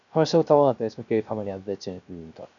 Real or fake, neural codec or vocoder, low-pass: fake; codec, 16 kHz, 0.3 kbps, FocalCodec; 7.2 kHz